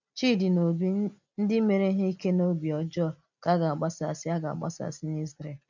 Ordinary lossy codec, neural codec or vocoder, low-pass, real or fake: none; none; 7.2 kHz; real